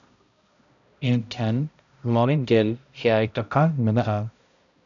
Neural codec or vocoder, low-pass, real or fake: codec, 16 kHz, 0.5 kbps, X-Codec, HuBERT features, trained on balanced general audio; 7.2 kHz; fake